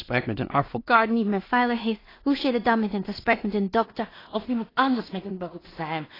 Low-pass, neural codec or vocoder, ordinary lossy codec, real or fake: 5.4 kHz; codec, 16 kHz in and 24 kHz out, 0.4 kbps, LongCat-Audio-Codec, two codebook decoder; AAC, 24 kbps; fake